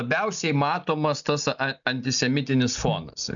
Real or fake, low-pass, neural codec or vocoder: real; 7.2 kHz; none